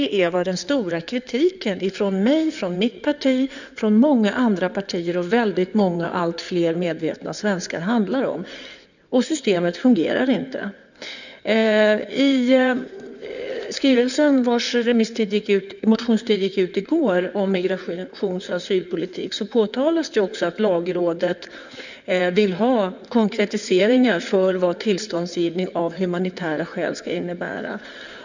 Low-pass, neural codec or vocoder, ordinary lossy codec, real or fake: 7.2 kHz; codec, 16 kHz in and 24 kHz out, 2.2 kbps, FireRedTTS-2 codec; none; fake